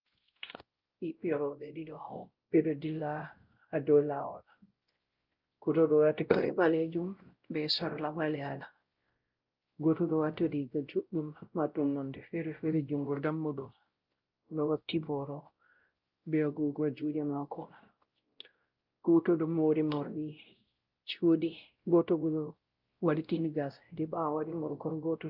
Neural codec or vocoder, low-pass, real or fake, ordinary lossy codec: codec, 16 kHz, 0.5 kbps, X-Codec, WavLM features, trained on Multilingual LibriSpeech; 5.4 kHz; fake; Opus, 24 kbps